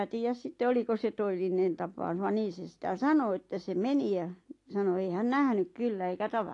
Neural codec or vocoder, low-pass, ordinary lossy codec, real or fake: none; 10.8 kHz; AAC, 48 kbps; real